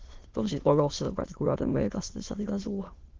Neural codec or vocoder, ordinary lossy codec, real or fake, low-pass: autoencoder, 22.05 kHz, a latent of 192 numbers a frame, VITS, trained on many speakers; Opus, 16 kbps; fake; 7.2 kHz